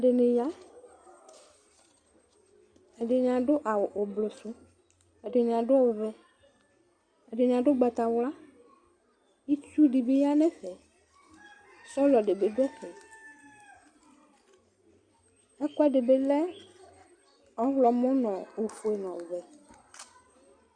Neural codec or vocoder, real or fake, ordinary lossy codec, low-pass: none; real; Opus, 64 kbps; 9.9 kHz